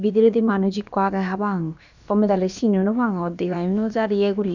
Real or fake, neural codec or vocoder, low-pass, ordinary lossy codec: fake; codec, 16 kHz, about 1 kbps, DyCAST, with the encoder's durations; 7.2 kHz; none